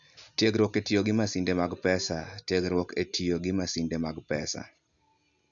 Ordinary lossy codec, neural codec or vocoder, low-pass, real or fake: none; none; 7.2 kHz; real